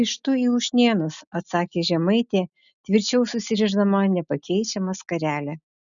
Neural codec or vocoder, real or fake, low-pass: none; real; 7.2 kHz